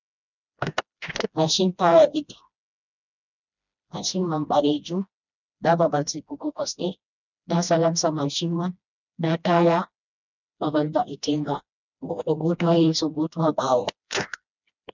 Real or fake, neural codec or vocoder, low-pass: fake; codec, 16 kHz, 1 kbps, FreqCodec, smaller model; 7.2 kHz